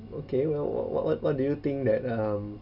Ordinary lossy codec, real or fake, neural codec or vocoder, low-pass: none; real; none; 5.4 kHz